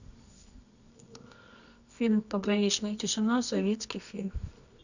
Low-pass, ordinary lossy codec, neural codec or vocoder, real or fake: 7.2 kHz; none; codec, 24 kHz, 0.9 kbps, WavTokenizer, medium music audio release; fake